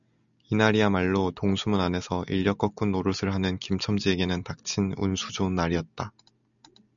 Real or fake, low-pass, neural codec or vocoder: real; 7.2 kHz; none